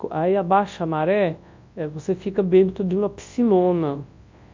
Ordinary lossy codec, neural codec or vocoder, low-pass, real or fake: MP3, 48 kbps; codec, 24 kHz, 0.9 kbps, WavTokenizer, large speech release; 7.2 kHz; fake